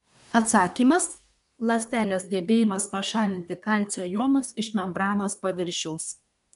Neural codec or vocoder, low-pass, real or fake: codec, 24 kHz, 1 kbps, SNAC; 10.8 kHz; fake